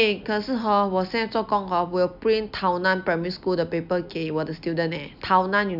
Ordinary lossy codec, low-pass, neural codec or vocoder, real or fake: none; 5.4 kHz; none; real